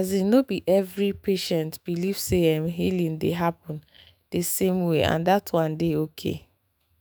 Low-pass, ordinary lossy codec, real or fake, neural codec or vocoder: none; none; fake; autoencoder, 48 kHz, 128 numbers a frame, DAC-VAE, trained on Japanese speech